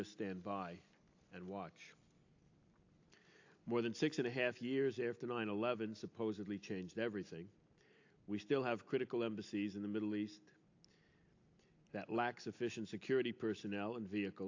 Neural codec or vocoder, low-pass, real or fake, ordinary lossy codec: none; 7.2 kHz; real; AAC, 48 kbps